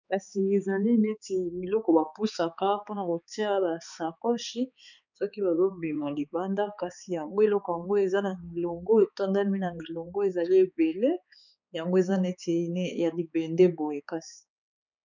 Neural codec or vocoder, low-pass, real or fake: codec, 16 kHz, 4 kbps, X-Codec, HuBERT features, trained on balanced general audio; 7.2 kHz; fake